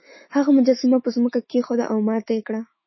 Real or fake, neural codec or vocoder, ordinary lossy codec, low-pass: fake; autoencoder, 48 kHz, 128 numbers a frame, DAC-VAE, trained on Japanese speech; MP3, 24 kbps; 7.2 kHz